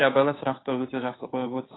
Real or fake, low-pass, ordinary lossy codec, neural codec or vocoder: fake; 7.2 kHz; AAC, 16 kbps; codec, 16 kHz, 2 kbps, FunCodec, trained on Chinese and English, 25 frames a second